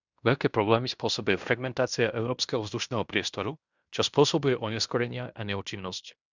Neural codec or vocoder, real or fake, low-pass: codec, 16 kHz in and 24 kHz out, 0.9 kbps, LongCat-Audio-Codec, fine tuned four codebook decoder; fake; 7.2 kHz